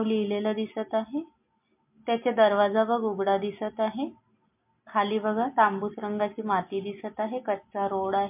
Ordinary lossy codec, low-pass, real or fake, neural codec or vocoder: MP3, 32 kbps; 3.6 kHz; real; none